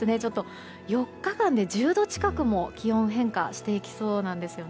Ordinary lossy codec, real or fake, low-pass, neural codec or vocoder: none; real; none; none